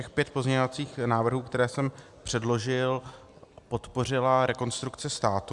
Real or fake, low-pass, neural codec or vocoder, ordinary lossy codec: real; 10.8 kHz; none; Opus, 64 kbps